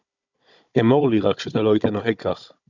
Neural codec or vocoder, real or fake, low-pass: codec, 16 kHz, 16 kbps, FunCodec, trained on Chinese and English, 50 frames a second; fake; 7.2 kHz